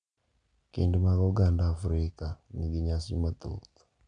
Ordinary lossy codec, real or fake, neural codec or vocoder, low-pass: none; real; none; 9.9 kHz